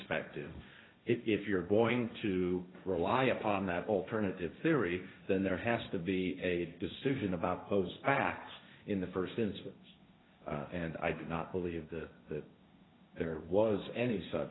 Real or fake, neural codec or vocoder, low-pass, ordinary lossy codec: fake; codec, 16 kHz, 1.1 kbps, Voila-Tokenizer; 7.2 kHz; AAC, 16 kbps